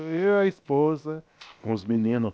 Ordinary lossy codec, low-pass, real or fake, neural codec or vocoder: none; none; fake; codec, 16 kHz, about 1 kbps, DyCAST, with the encoder's durations